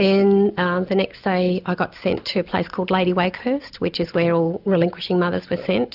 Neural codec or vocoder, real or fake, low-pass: none; real; 5.4 kHz